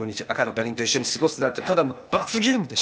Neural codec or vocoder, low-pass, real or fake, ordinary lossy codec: codec, 16 kHz, 0.8 kbps, ZipCodec; none; fake; none